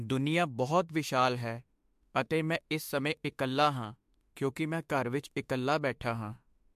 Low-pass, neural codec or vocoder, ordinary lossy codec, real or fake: 14.4 kHz; autoencoder, 48 kHz, 32 numbers a frame, DAC-VAE, trained on Japanese speech; MP3, 64 kbps; fake